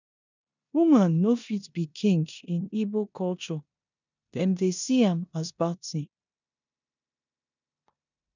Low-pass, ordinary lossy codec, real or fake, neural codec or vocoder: 7.2 kHz; none; fake; codec, 16 kHz in and 24 kHz out, 0.9 kbps, LongCat-Audio-Codec, four codebook decoder